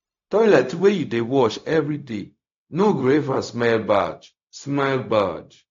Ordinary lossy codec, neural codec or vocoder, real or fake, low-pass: AAC, 48 kbps; codec, 16 kHz, 0.4 kbps, LongCat-Audio-Codec; fake; 7.2 kHz